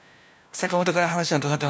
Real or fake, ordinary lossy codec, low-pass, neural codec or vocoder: fake; none; none; codec, 16 kHz, 1 kbps, FunCodec, trained on LibriTTS, 50 frames a second